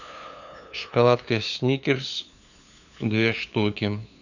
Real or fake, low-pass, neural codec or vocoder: fake; 7.2 kHz; codec, 16 kHz, 2 kbps, FunCodec, trained on LibriTTS, 25 frames a second